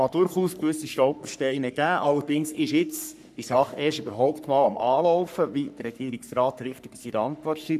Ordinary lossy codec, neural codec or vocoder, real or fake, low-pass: none; codec, 44.1 kHz, 3.4 kbps, Pupu-Codec; fake; 14.4 kHz